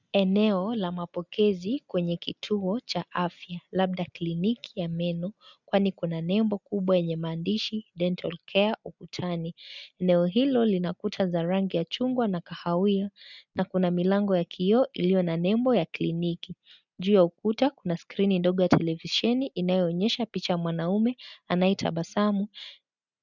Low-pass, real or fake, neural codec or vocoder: 7.2 kHz; real; none